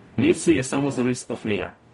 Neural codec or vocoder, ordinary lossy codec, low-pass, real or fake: codec, 44.1 kHz, 0.9 kbps, DAC; MP3, 48 kbps; 19.8 kHz; fake